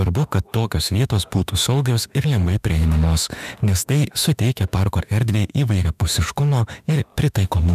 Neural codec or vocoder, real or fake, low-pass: autoencoder, 48 kHz, 32 numbers a frame, DAC-VAE, trained on Japanese speech; fake; 14.4 kHz